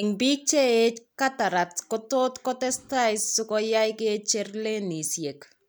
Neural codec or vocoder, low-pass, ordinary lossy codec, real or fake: none; none; none; real